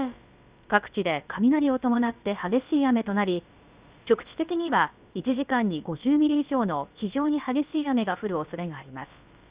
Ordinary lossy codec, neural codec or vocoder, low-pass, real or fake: Opus, 64 kbps; codec, 16 kHz, about 1 kbps, DyCAST, with the encoder's durations; 3.6 kHz; fake